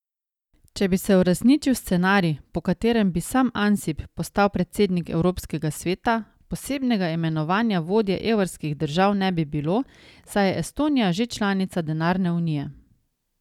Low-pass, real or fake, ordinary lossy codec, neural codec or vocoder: 19.8 kHz; real; none; none